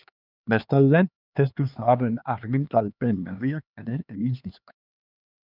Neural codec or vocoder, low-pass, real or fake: codec, 16 kHz, 2 kbps, X-Codec, HuBERT features, trained on LibriSpeech; 5.4 kHz; fake